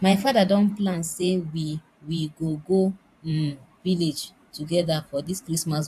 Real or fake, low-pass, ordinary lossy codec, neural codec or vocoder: fake; 14.4 kHz; AAC, 96 kbps; vocoder, 44.1 kHz, 128 mel bands every 512 samples, BigVGAN v2